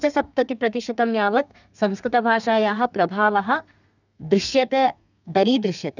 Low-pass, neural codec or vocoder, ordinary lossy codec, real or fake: 7.2 kHz; codec, 32 kHz, 1.9 kbps, SNAC; none; fake